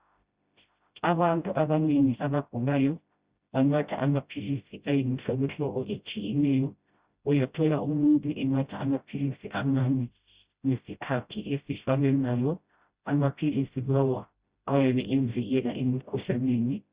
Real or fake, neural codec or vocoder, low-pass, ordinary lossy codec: fake; codec, 16 kHz, 0.5 kbps, FreqCodec, smaller model; 3.6 kHz; Opus, 64 kbps